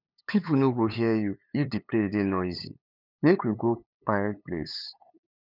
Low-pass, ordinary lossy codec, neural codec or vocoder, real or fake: 5.4 kHz; none; codec, 16 kHz, 8 kbps, FunCodec, trained on LibriTTS, 25 frames a second; fake